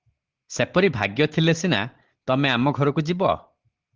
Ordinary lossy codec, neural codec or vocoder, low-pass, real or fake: Opus, 16 kbps; none; 7.2 kHz; real